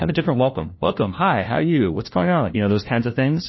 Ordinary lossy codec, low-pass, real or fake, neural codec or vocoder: MP3, 24 kbps; 7.2 kHz; fake; codec, 16 kHz, 1 kbps, FunCodec, trained on LibriTTS, 50 frames a second